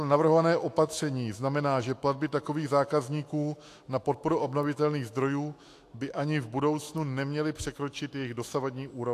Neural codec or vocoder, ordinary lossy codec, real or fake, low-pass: autoencoder, 48 kHz, 128 numbers a frame, DAC-VAE, trained on Japanese speech; AAC, 64 kbps; fake; 14.4 kHz